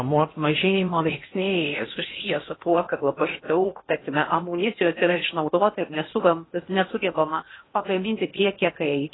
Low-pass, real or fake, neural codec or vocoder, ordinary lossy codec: 7.2 kHz; fake; codec, 16 kHz in and 24 kHz out, 0.8 kbps, FocalCodec, streaming, 65536 codes; AAC, 16 kbps